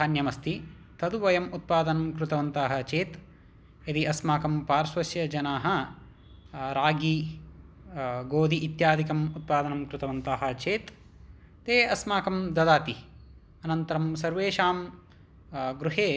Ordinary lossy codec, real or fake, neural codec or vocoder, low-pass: none; real; none; none